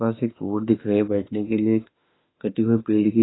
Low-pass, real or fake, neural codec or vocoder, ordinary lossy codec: 7.2 kHz; fake; autoencoder, 48 kHz, 32 numbers a frame, DAC-VAE, trained on Japanese speech; AAC, 16 kbps